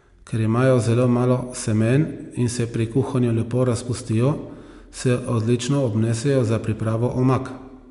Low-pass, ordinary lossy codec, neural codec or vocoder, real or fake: 10.8 kHz; MP3, 64 kbps; none; real